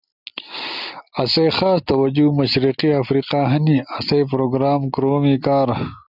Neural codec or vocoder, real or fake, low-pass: none; real; 5.4 kHz